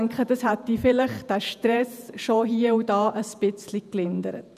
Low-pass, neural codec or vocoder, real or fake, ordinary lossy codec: 14.4 kHz; vocoder, 48 kHz, 128 mel bands, Vocos; fake; none